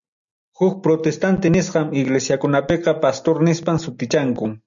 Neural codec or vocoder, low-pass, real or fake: none; 7.2 kHz; real